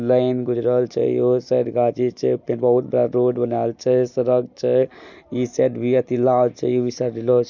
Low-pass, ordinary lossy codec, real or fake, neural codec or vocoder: 7.2 kHz; none; real; none